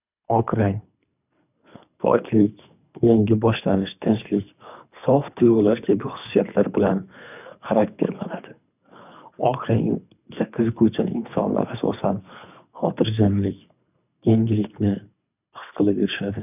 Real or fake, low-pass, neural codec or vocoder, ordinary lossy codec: fake; 3.6 kHz; codec, 24 kHz, 3 kbps, HILCodec; none